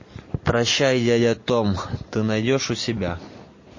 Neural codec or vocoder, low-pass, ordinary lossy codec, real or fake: none; 7.2 kHz; MP3, 32 kbps; real